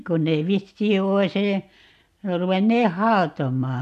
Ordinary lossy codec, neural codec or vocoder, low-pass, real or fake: MP3, 96 kbps; none; 14.4 kHz; real